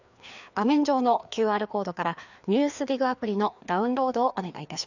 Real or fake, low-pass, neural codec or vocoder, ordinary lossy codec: fake; 7.2 kHz; codec, 16 kHz, 2 kbps, FreqCodec, larger model; none